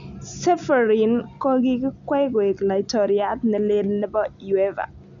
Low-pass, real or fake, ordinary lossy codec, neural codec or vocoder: 7.2 kHz; real; none; none